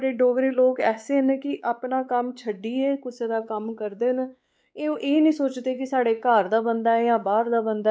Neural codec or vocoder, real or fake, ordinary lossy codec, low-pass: codec, 16 kHz, 4 kbps, X-Codec, WavLM features, trained on Multilingual LibriSpeech; fake; none; none